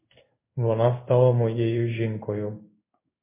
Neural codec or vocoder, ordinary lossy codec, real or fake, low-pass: codec, 16 kHz in and 24 kHz out, 1 kbps, XY-Tokenizer; MP3, 24 kbps; fake; 3.6 kHz